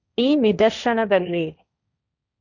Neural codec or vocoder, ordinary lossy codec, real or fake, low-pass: codec, 16 kHz, 1.1 kbps, Voila-Tokenizer; none; fake; 7.2 kHz